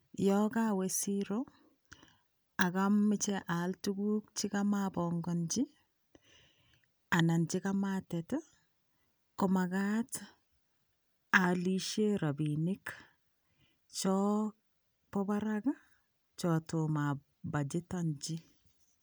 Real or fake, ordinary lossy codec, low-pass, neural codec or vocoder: real; none; none; none